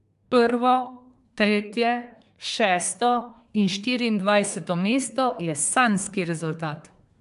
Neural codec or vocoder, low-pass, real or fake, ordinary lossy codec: codec, 24 kHz, 1 kbps, SNAC; 10.8 kHz; fake; AAC, 96 kbps